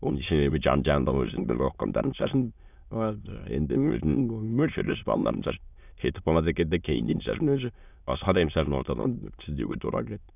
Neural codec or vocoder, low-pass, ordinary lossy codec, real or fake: autoencoder, 22.05 kHz, a latent of 192 numbers a frame, VITS, trained on many speakers; 3.6 kHz; none; fake